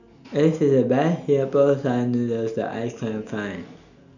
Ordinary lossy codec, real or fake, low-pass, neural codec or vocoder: none; real; 7.2 kHz; none